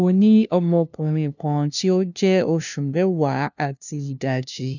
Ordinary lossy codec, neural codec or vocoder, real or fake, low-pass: none; codec, 16 kHz, 0.5 kbps, FunCodec, trained on LibriTTS, 25 frames a second; fake; 7.2 kHz